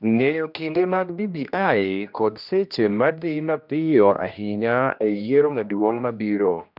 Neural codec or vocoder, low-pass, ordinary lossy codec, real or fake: codec, 16 kHz, 1 kbps, X-Codec, HuBERT features, trained on general audio; 5.4 kHz; none; fake